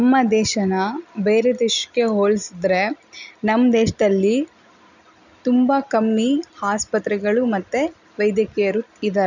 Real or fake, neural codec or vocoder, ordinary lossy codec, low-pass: real; none; none; 7.2 kHz